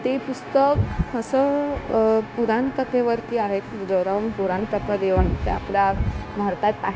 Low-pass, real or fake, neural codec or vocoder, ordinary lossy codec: none; fake; codec, 16 kHz, 0.9 kbps, LongCat-Audio-Codec; none